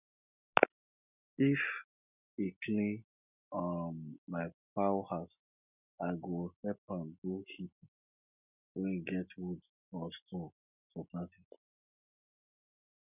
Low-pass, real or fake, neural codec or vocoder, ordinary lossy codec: 3.6 kHz; real; none; none